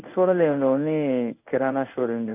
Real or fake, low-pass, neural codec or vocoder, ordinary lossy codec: fake; 3.6 kHz; codec, 16 kHz in and 24 kHz out, 1 kbps, XY-Tokenizer; none